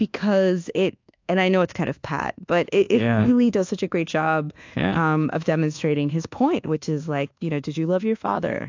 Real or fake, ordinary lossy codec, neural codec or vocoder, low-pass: fake; AAC, 48 kbps; codec, 24 kHz, 1.2 kbps, DualCodec; 7.2 kHz